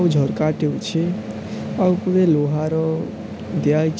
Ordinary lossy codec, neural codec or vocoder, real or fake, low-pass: none; none; real; none